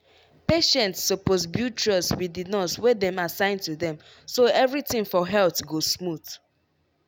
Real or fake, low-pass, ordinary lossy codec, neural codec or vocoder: real; 19.8 kHz; none; none